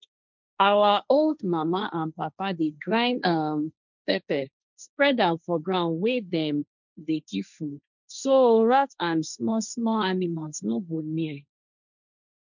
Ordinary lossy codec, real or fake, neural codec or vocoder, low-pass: none; fake; codec, 16 kHz, 1.1 kbps, Voila-Tokenizer; 7.2 kHz